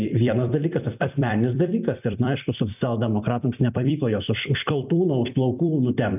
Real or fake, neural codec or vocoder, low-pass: fake; vocoder, 44.1 kHz, 128 mel bands every 256 samples, BigVGAN v2; 3.6 kHz